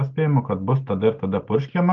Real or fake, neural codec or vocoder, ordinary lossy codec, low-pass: real; none; Opus, 32 kbps; 7.2 kHz